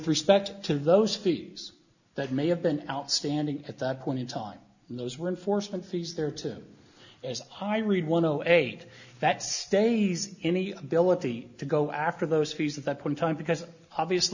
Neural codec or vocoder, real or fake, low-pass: none; real; 7.2 kHz